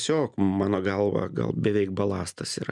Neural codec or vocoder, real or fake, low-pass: none; real; 10.8 kHz